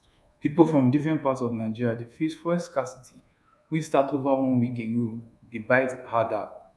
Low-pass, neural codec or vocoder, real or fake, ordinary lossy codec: none; codec, 24 kHz, 1.2 kbps, DualCodec; fake; none